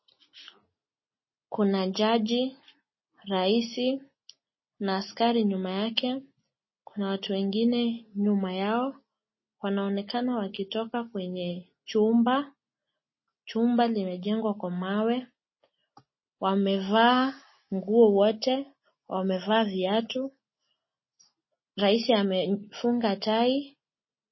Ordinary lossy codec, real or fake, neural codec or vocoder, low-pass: MP3, 24 kbps; real; none; 7.2 kHz